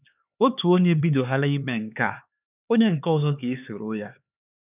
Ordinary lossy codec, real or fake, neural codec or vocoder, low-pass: none; fake; codec, 16 kHz, 4 kbps, X-Codec, HuBERT features, trained on LibriSpeech; 3.6 kHz